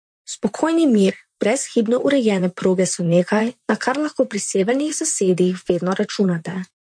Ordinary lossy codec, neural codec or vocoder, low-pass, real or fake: MP3, 48 kbps; vocoder, 44.1 kHz, 128 mel bands, Pupu-Vocoder; 9.9 kHz; fake